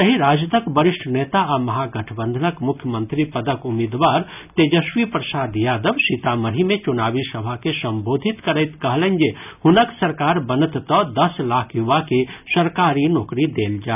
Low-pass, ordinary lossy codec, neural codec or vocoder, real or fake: 3.6 kHz; none; none; real